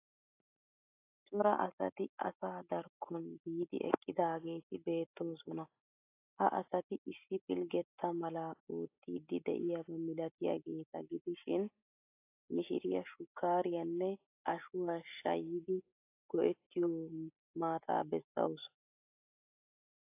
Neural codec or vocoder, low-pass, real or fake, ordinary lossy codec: none; 3.6 kHz; real; AAC, 24 kbps